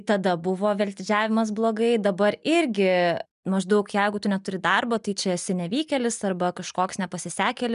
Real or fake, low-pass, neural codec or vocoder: real; 10.8 kHz; none